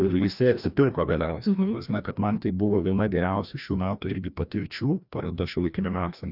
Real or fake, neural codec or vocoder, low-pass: fake; codec, 16 kHz, 1 kbps, FreqCodec, larger model; 5.4 kHz